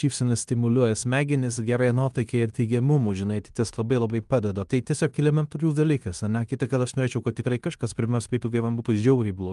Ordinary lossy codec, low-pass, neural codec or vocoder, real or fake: Opus, 32 kbps; 10.8 kHz; codec, 16 kHz in and 24 kHz out, 0.9 kbps, LongCat-Audio-Codec, fine tuned four codebook decoder; fake